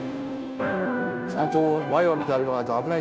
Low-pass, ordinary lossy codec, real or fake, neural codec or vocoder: none; none; fake; codec, 16 kHz, 0.5 kbps, FunCodec, trained on Chinese and English, 25 frames a second